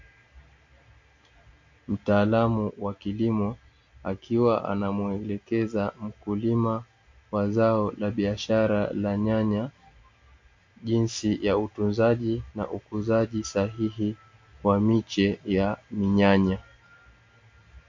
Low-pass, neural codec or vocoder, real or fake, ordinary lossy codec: 7.2 kHz; none; real; MP3, 48 kbps